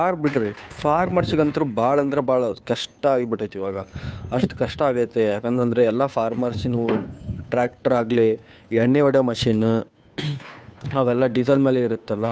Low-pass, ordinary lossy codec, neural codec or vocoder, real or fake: none; none; codec, 16 kHz, 2 kbps, FunCodec, trained on Chinese and English, 25 frames a second; fake